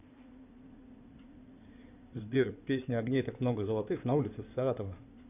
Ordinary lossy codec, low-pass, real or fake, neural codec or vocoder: none; 3.6 kHz; fake; codec, 16 kHz in and 24 kHz out, 2.2 kbps, FireRedTTS-2 codec